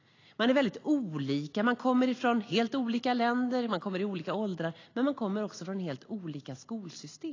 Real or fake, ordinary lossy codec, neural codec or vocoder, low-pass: real; AAC, 32 kbps; none; 7.2 kHz